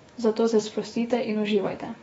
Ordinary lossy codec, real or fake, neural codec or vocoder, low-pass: AAC, 24 kbps; fake; autoencoder, 48 kHz, 128 numbers a frame, DAC-VAE, trained on Japanese speech; 19.8 kHz